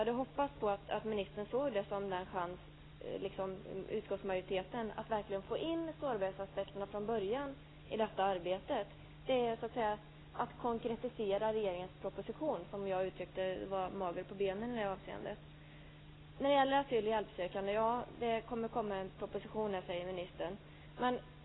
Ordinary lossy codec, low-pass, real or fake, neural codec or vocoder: AAC, 16 kbps; 7.2 kHz; real; none